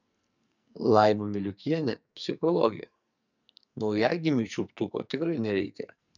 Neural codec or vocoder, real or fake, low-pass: codec, 44.1 kHz, 2.6 kbps, SNAC; fake; 7.2 kHz